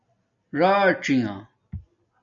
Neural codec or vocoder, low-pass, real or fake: none; 7.2 kHz; real